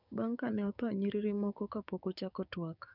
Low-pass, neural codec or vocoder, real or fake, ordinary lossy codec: 5.4 kHz; codec, 16 kHz, 6 kbps, DAC; fake; none